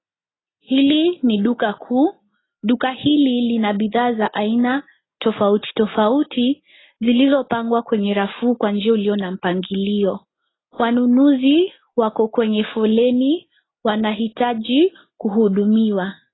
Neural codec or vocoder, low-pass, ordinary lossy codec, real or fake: none; 7.2 kHz; AAC, 16 kbps; real